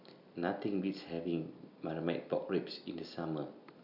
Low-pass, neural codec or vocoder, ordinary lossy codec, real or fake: 5.4 kHz; none; none; real